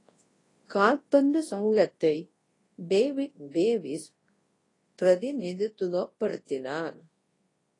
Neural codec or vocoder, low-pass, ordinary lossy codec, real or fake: codec, 24 kHz, 0.9 kbps, WavTokenizer, large speech release; 10.8 kHz; AAC, 32 kbps; fake